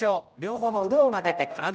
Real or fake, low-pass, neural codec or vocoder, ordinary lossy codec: fake; none; codec, 16 kHz, 0.5 kbps, X-Codec, HuBERT features, trained on general audio; none